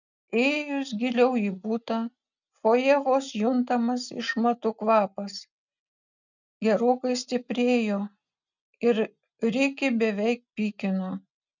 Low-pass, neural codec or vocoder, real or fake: 7.2 kHz; none; real